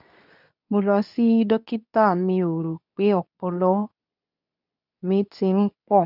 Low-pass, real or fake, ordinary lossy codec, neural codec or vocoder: 5.4 kHz; fake; none; codec, 24 kHz, 0.9 kbps, WavTokenizer, medium speech release version 2